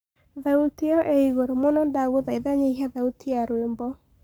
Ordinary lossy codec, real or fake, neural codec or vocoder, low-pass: none; fake; codec, 44.1 kHz, 7.8 kbps, Pupu-Codec; none